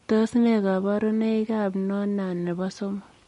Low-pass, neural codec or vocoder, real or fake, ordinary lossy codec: 10.8 kHz; none; real; MP3, 48 kbps